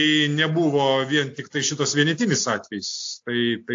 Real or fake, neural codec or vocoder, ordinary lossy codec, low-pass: real; none; AAC, 48 kbps; 7.2 kHz